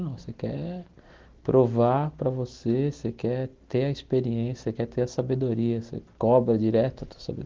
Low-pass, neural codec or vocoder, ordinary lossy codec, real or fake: 7.2 kHz; none; Opus, 16 kbps; real